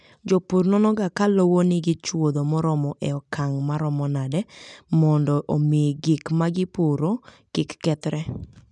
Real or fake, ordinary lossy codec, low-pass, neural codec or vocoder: real; none; 10.8 kHz; none